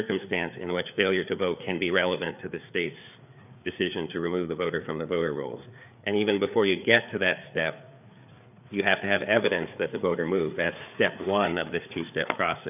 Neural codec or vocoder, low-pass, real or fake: codec, 16 kHz, 4 kbps, FreqCodec, larger model; 3.6 kHz; fake